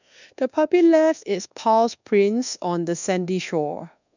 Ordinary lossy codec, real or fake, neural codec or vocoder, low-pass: none; fake; codec, 24 kHz, 1.2 kbps, DualCodec; 7.2 kHz